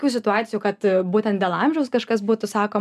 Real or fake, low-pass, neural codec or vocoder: real; 14.4 kHz; none